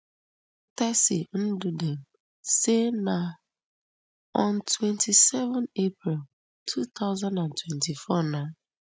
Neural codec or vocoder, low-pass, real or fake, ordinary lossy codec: none; none; real; none